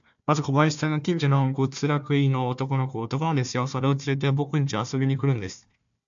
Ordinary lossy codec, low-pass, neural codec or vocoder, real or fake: AAC, 64 kbps; 7.2 kHz; codec, 16 kHz, 1 kbps, FunCodec, trained on Chinese and English, 50 frames a second; fake